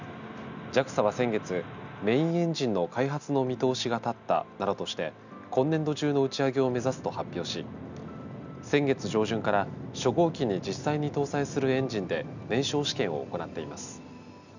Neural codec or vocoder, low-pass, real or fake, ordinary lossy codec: none; 7.2 kHz; real; none